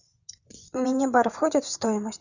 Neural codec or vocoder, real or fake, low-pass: vocoder, 24 kHz, 100 mel bands, Vocos; fake; 7.2 kHz